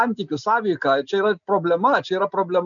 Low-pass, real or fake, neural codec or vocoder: 7.2 kHz; real; none